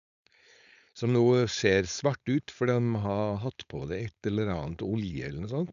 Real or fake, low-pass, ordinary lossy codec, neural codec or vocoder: fake; 7.2 kHz; none; codec, 16 kHz, 4.8 kbps, FACodec